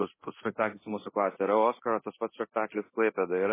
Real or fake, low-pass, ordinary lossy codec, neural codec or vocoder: fake; 3.6 kHz; MP3, 16 kbps; codec, 24 kHz, 0.9 kbps, DualCodec